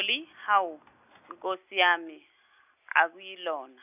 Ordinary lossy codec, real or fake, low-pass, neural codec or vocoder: none; real; 3.6 kHz; none